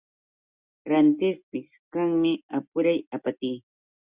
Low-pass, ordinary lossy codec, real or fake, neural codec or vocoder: 3.6 kHz; Opus, 64 kbps; real; none